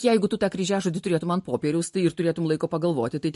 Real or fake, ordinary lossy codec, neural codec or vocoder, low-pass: real; MP3, 48 kbps; none; 14.4 kHz